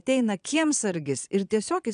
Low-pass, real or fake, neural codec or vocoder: 9.9 kHz; fake; vocoder, 22.05 kHz, 80 mel bands, Vocos